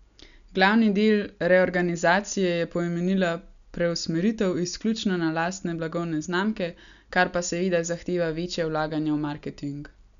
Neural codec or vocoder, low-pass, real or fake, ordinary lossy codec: none; 7.2 kHz; real; none